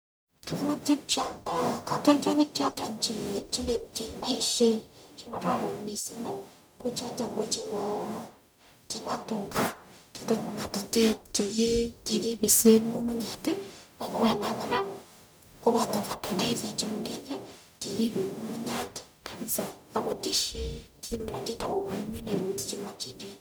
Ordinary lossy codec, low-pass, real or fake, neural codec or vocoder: none; none; fake; codec, 44.1 kHz, 0.9 kbps, DAC